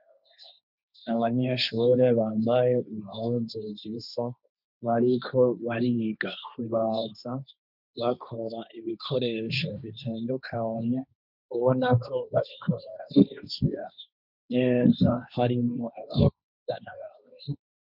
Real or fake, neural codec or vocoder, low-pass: fake; codec, 16 kHz, 1.1 kbps, Voila-Tokenizer; 5.4 kHz